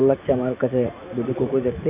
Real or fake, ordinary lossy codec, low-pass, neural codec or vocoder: fake; none; 3.6 kHz; vocoder, 44.1 kHz, 128 mel bands every 512 samples, BigVGAN v2